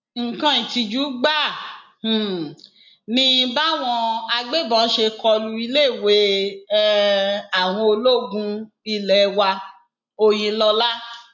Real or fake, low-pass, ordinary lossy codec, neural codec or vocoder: real; 7.2 kHz; none; none